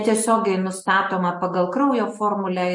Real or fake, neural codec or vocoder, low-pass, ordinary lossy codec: real; none; 10.8 kHz; MP3, 48 kbps